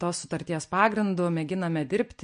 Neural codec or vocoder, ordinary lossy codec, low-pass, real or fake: none; MP3, 48 kbps; 9.9 kHz; real